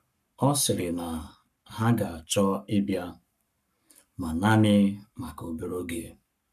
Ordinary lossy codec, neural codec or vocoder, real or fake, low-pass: none; codec, 44.1 kHz, 7.8 kbps, Pupu-Codec; fake; 14.4 kHz